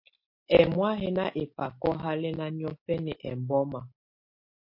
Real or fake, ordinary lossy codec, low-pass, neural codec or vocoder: real; MP3, 32 kbps; 5.4 kHz; none